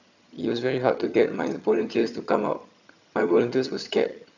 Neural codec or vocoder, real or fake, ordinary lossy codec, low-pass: vocoder, 22.05 kHz, 80 mel bands, HiFi-GAN; fake; none; 7.2 kHz